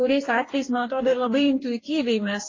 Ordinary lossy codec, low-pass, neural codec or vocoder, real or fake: AAC, 32 kbps; 7.2 kHz; codec, 44.1 kHz, 2.6 kbps, DAC; fake